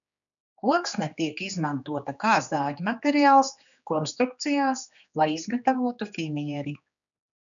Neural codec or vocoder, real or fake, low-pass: codec, 16 kHz, 2 kbps, X-Codec, HuBERT features, trained on general audio; fake; 7.2 kHz